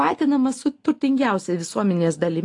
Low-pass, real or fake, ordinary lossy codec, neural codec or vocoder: 10.8 kHz; real; AAC, 48 kbps; none